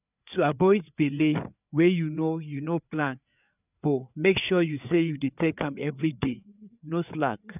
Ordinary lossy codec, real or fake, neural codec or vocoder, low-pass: none; fake; codec, 16 kHz, 4 kbps, FreqCodec, larger model; 3.6 kHz